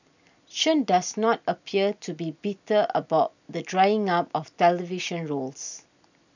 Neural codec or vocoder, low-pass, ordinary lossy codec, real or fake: none; 7.2 kHz; none; real